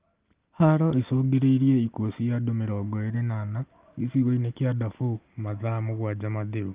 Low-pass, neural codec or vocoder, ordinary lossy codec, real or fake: 3.6 kHz; none; Opus, 24 kbps; real